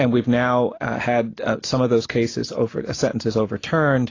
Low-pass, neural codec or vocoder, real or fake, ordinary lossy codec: 7.2 kHz; none; real; AAC, 32 kbps